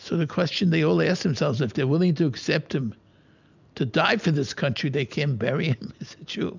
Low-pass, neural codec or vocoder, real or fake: 7.2 kHz; none; real